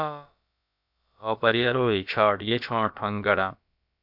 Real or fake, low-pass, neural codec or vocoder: fake; 5.4 kHz; codec, 16 kHz, about 1 kbps, DyCAST, with the encoder's durations